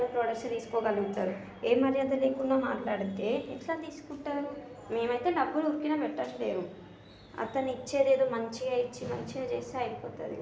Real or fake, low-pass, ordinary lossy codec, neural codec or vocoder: real; none; none; none